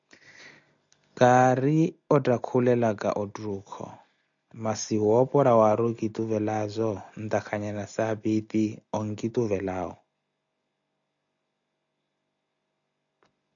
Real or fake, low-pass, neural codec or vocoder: real; 7.2 kHz; none